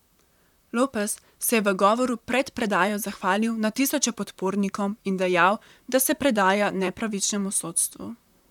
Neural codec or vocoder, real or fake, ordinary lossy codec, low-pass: vocoder, 44.1 kHz, 128 mel bands, Pupu-Vocoder; fake; none; 19.8 kHz